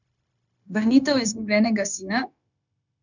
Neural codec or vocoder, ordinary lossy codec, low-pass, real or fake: codec, 16 kHz, 0.9 kbps, LongCat-Audio-Codec; none; 7.2 kHz; fake